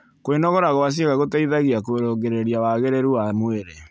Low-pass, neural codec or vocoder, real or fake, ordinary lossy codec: none; none; real; none